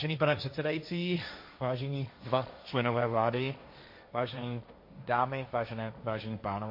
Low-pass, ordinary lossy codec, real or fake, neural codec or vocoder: 5.4 kHz; MP3, 32 kbps; fake; codec, 16 kHz, 1.1 kbps, Voila-Tokenizer